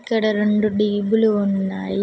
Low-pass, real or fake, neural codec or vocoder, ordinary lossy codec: none; real; none; none